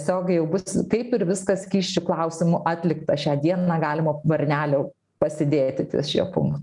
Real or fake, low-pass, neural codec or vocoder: real; 10.8 kHz; none